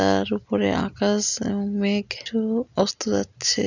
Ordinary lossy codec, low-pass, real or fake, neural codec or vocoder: none; 7.2 kHz; real; none